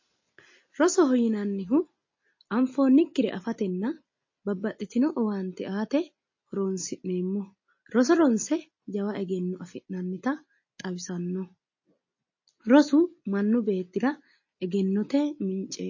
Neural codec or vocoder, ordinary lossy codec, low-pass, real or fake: none; MP3, 32 kbps; 7.2 kHz; real